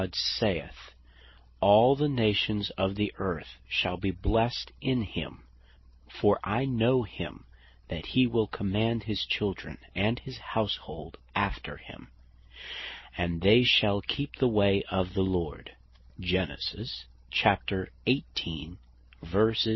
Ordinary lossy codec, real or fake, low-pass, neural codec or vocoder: MP3, 24 kbps; real; 7.2 kHz; none